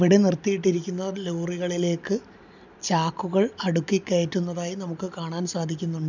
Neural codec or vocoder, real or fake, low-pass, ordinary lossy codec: none; real; 7.2 kHz; none